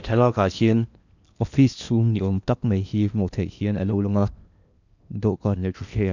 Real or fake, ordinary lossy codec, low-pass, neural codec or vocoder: fake; none; 7.2 kHz; codec, 16 kHz in and 24 kHz out, 0.8 kbps, FocalCodec, streaming, 65536 codes